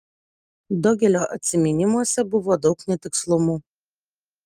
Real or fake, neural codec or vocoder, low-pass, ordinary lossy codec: real; none; 14.4 kHz; Opus, 32 kbps